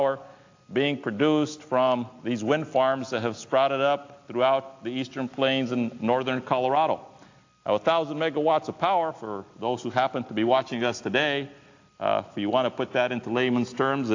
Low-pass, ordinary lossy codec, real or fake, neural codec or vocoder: 7.2 kHz; AAC, 48 kbps; real; none